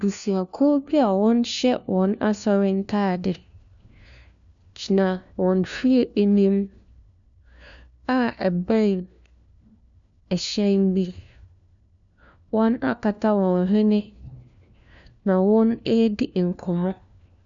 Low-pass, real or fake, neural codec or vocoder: 7.2 kHz; fake; codec, 16 kHz, 1 kbps, FunCodec, trained on LibriTTS, 50 frames a second